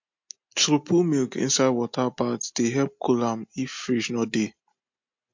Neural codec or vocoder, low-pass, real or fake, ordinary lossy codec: none; 7.2 kHz; real; MP3, 48 kbps